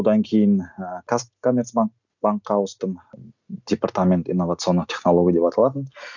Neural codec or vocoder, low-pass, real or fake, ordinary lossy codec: none; 7.2 kHz; real; none